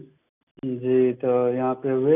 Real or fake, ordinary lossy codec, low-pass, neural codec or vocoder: real; none; 3.6 kHz; none